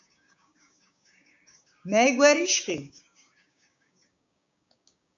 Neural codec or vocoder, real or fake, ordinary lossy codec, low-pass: codec, 16 kHz, 6 kbps, DAC; fake; AAC, 64 kbps; 7.2 kHz